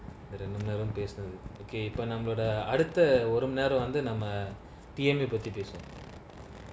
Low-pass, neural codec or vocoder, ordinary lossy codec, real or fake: none; none; none; real